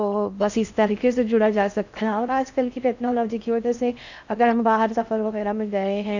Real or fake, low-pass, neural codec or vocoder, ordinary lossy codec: fake; 7.2 kHz; codec, 16 kHz in and 24 kHz out, 0.6 kbps, FocalCodec, streaming, 4096 codes; none